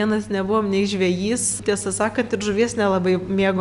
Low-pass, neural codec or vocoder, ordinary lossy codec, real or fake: 10.8 kHz; none; Opus, 64 kbps; real